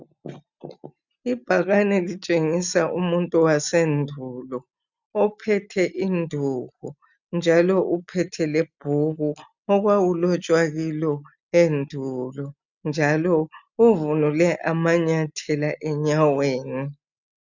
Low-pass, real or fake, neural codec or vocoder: 7.2 kHz; real; none